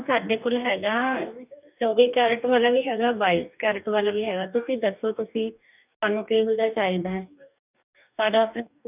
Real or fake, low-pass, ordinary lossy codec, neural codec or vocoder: fake; 3.6 kHz; none; codec, 44.1 kHz, 2.6 kbps, DAC